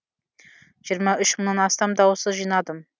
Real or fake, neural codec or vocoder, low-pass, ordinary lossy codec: real; none; none; none